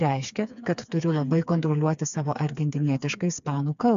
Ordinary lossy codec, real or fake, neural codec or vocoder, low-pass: AAC, 96 kbps; fake; codec, 16 kHz, 4 kbps, FreqCodec, smaller model; 7.2 kHz